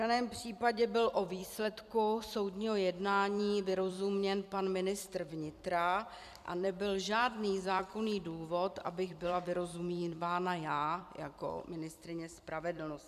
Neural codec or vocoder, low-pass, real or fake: vocoder, 44.1 kHz, 128 mel bands every 256 samples, BigVGAN v2; 14.4 kHz; fake